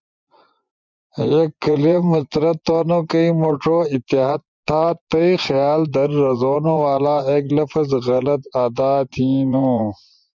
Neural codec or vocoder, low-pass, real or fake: vocoder, 24 kHz, 100 mel bands, Vocos; 7.2 kHz; fake